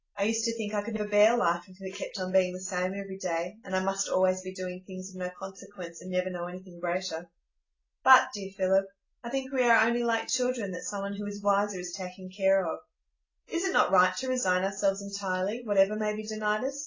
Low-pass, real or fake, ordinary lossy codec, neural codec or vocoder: 7.2 kHz; real; AAC, 32 kbps; none